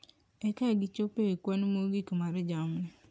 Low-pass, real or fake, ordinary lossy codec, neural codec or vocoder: none; real; none; none